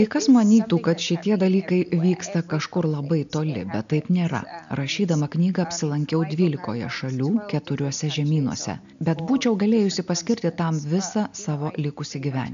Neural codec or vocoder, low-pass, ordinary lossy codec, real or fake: none; 7.2 kHz; AAC, 96 kbps; real